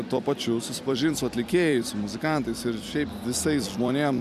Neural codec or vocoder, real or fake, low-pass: vocoder, 44.1 kHz, 128 mel bands every 256 samples, BigVGAN v2; fake; 14.4 kHz